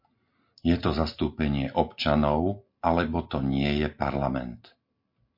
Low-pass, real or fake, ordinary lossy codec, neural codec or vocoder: 5.4 kHz; real; MP3, 32 kbps; none